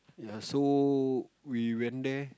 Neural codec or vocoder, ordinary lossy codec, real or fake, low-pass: none; none; real; none